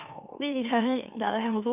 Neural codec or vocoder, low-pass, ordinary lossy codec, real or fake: autoencoder, 44.1 kHz, a latent of 192 numbers a frame, MeloTTS; 3.6 kHz; none; fake